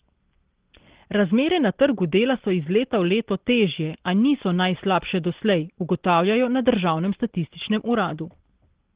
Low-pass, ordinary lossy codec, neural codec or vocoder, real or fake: 3.6 kHz; Opus, 16 kbps; none; real